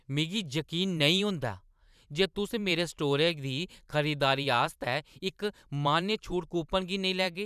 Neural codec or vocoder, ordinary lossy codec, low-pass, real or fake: vocoder, 44.1 kHz, 128 mel bands every 512 samples, BigVGAN v2; none; 14.4 kHz; fake